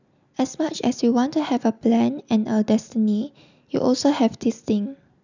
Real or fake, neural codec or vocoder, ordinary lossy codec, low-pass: real; none; none; 7.2 kHz